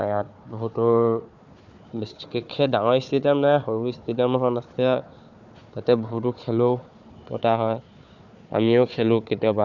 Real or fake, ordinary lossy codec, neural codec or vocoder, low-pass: fake; none; codec, 16 kHz, 4 kbps, FunCodec, trained on Chinese and English, 50 frames a second; 7.2 kHz